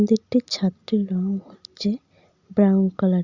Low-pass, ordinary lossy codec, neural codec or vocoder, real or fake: 7.2 kHz; Opus, 64 kbps; none; real